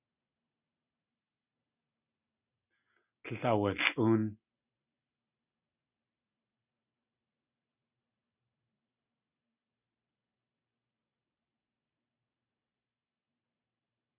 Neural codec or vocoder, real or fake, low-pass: none; real; 3.6 kHz